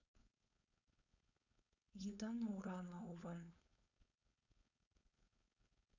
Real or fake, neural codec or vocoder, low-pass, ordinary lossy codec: fake; codec, 16 kHz, 4.8 kbps, FACodec; 7.2 kHz; none